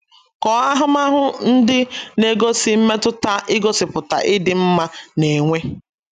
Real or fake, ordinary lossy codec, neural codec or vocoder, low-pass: real; none; none; 14.4 kHz